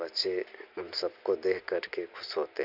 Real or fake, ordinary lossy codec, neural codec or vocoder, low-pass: real; none; none; 5.4 kHz